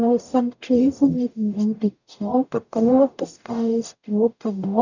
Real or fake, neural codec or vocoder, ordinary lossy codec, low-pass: fake; codec, 44.1 kHz, 0.9 kbps, DAC; none; 7.2 kHz